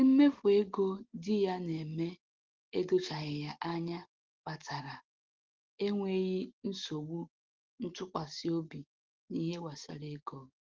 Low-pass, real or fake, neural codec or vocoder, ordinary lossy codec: 7.2 kHz; real; none; Opus, 16 kbps